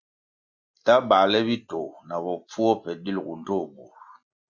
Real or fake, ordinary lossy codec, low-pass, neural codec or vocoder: real; Opus, 64 kbps; 7.2 kHz; none